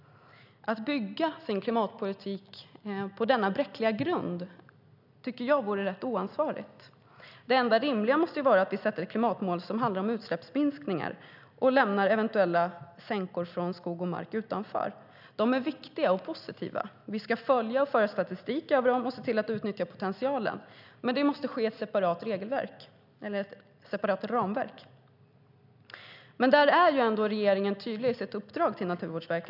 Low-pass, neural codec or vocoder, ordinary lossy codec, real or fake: 5.4 kHz; none; none; real